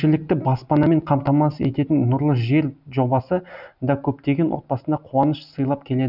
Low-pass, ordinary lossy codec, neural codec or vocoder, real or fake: 5.4 kHz; none; none; real